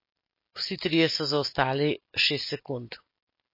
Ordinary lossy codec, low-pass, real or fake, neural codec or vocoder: MP3, 24 kbps; 5.4 kHz; real; none